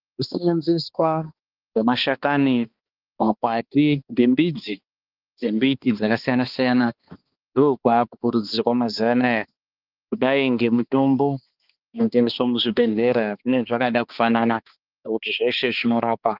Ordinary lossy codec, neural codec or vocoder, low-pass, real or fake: Opus, 24 kbps; codec, 16 kHz, 2 kbps, X-Codec, HuBERT features, trained on balanced general audio; 5.4 kHz; fake